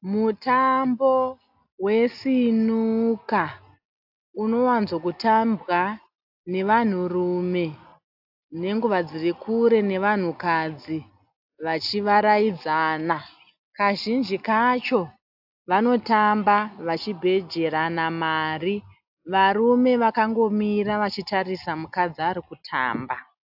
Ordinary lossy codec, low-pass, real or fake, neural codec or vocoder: AAC, 48 kbps; 5.4 kHz; real; none